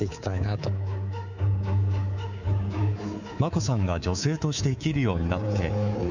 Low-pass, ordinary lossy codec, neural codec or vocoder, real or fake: 7.2 kHz; none; codec, 24 kHz, 3.1 kbps, DualCodec; fake